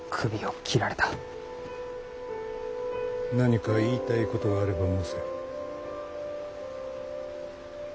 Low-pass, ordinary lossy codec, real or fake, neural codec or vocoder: none; none; real; none